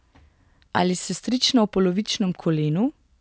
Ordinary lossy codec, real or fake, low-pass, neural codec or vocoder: none; real; none; none